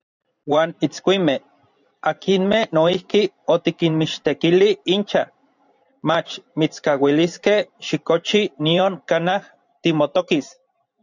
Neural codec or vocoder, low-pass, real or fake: vocoder, 44.1 kHz, 128 mel bands every 512 samples, BigVGAN v2; 7.2 kHz; fake